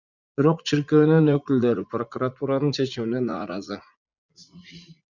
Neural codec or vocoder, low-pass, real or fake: vocoder, 44.1 kHz, 80 mel bands, Vocos; 7.2 kHz; fake